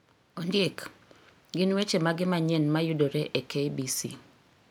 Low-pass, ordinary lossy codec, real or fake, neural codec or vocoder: none; none; real; none